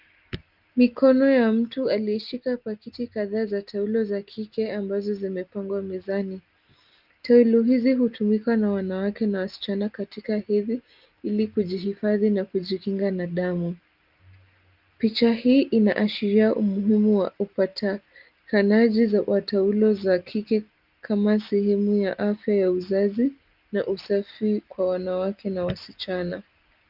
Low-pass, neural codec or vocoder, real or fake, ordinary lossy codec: 5.4 kHz; none; real; Opus, 24 kbps